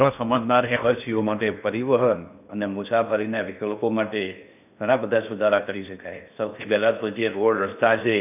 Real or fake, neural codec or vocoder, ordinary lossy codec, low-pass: fake; codec, 16 kHz in and 24 kHz out, 0.6 kbps, FocalCodec, streaming, 2048 codes; none; 3.6 kHz